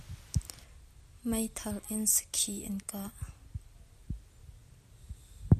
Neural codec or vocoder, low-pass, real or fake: none; 14.4 kHz; real